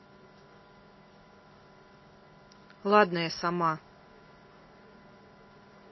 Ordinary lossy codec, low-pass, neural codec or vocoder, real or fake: MP3, 24 kbps; 7.2 kHz; none; real